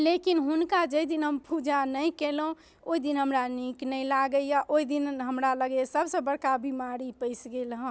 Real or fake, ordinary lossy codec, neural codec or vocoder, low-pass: real; none; none; none